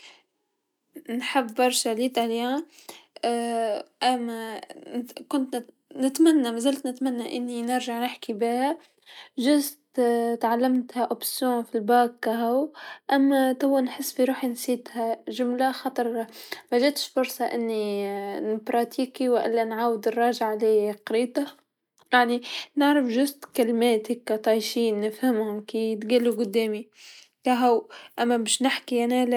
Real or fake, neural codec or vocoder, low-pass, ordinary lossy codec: real; none; 19.8 kHz; none